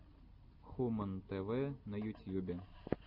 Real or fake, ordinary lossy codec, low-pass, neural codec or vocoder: real; Opus, 64 kbps; 5.4 kHz; none